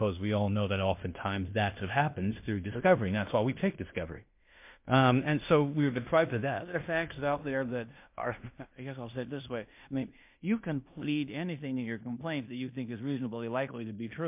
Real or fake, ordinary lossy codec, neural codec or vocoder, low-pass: fake; MP3, 32 kbps; codec, 16 kHz in and 24 kHz out, 0.9 kbps, LongCat-Audio-Codec, fine tuned four codebook decoder; 3.6 kHz